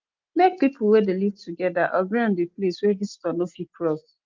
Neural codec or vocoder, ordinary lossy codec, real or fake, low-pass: none; Opus, 24 kbps; real; 7.2 kHz